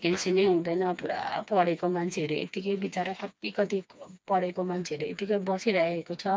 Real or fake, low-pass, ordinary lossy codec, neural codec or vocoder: fake; none; none; codec, 16 kHz, 2 kbps, FreqCodec, smaller model